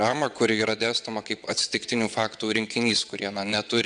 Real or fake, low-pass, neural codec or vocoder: fake; 9.9 kHz; vocoder, 22.05 kHz, 80 mel bands, WaveNeXt